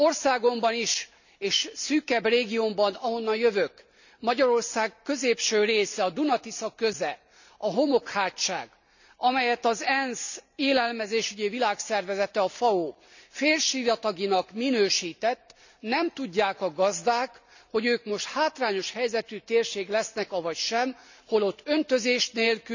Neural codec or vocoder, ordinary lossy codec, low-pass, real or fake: none; none; 7.2 kHz; real